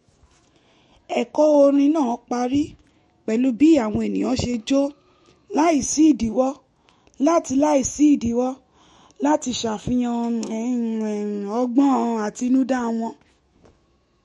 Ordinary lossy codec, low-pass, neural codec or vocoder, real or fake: MP3, 48 kbps; 19.8 kHz; autoencoder, 48 kHz, 128 numbers a frame, DAC-VAE, trained on Japanese speech; fake